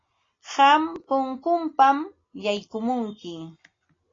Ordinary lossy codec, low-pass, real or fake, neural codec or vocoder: AAC, 32 kbps; 7.2 kHz; real; none